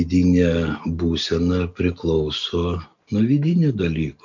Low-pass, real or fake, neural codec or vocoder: 7.2 kHz; real; none